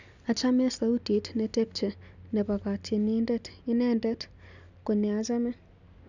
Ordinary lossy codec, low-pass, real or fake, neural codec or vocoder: none; 7.2 kHz; real; none